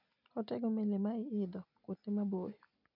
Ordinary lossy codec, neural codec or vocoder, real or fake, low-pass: none; none; real; 5.4 kHz